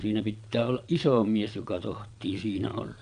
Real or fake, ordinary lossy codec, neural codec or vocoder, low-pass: fake; none; vocoder, 22.05 kHz, 80 mel bands, Vocos; 9.9 kHz